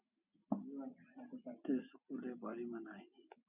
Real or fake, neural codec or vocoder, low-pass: real; none; 3.6 kHz